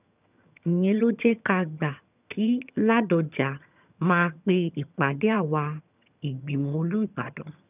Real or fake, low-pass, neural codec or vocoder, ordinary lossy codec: fake; 3.6 kHz; vocoder, 22.05 kHz, 80 mel bands, HiFi-GAN; none